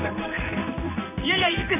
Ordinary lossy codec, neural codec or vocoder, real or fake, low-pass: none; codec, 16 kHz in and 24 kHz out, 1 kbps, XY-Tokenizer; fake; 3.6 kHz